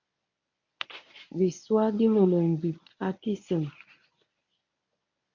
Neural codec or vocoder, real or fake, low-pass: codec, 24 kHz, 0.9 kbps, WavTokenizer, medium speech release version 1; fake; 7.2 kHz